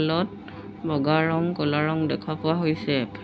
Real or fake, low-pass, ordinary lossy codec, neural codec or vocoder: real; none; none; none